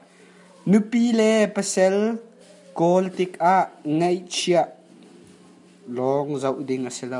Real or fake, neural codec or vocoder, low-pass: real; none; 10.8 kHz